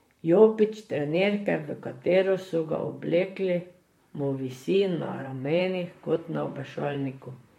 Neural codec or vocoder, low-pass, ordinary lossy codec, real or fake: vocoder, 44.1 kHz, 128 mel bands, Pupu-Vocoder; 19.8 kHz; MP3, 64 kbps; fake